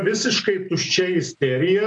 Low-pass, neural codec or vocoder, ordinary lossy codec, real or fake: 10.8 kHz; none; MP3, 64 kbps; real